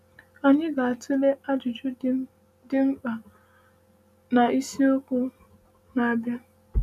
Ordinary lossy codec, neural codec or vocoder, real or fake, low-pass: none; none; real; 14.4 kHz